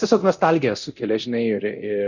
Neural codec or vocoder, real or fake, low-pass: codec, 24 kHz, 0.9 kbps, DualCodec; fake; 7.2 kHz